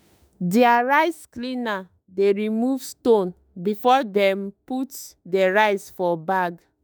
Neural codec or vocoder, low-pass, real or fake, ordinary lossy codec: autoencoder, 48 kHz, 32 numbers a frame, DAC-VAE, trained on Japanese speech; none; fake; none